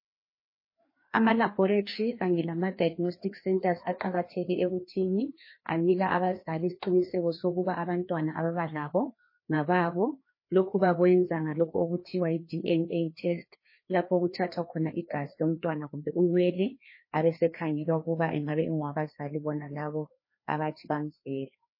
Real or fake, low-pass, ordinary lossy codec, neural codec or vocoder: fake; 5.4 kHz; MP3, 24 kbps; codec, 16 kHz, 2 kbps, FreqCodec, larger model